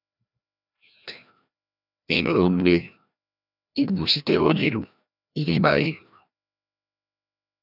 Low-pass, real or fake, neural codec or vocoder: 5.4 kHz; fake; codec, 16 kHz, 1 kbps, FreqCodec, larger model